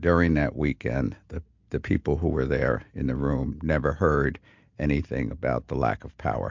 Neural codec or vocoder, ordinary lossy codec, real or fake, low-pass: none; MP3, 64 kbps; real; 7.2 kHz